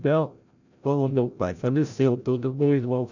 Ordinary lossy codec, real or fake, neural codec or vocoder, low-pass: none; fake; codec, 16 kHz, 0.5 kbps, FreqCodec, larger model; 7.2 kHz